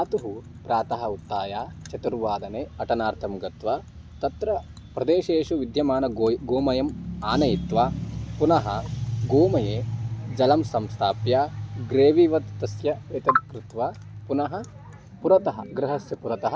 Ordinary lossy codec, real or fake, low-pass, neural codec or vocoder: none; real; none; none